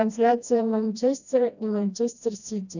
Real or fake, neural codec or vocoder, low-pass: fake; codec, 16 kHz, 1 kbps, FreqCodec, smaller model; 7.2 kHz